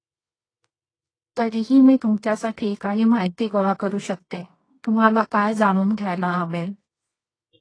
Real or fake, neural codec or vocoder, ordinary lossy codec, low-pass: fake; codec, 24 kHz, 0.9 kbps, WavTokenizer, medium music audio release; AAC, 32 kbps; 9.9 kHz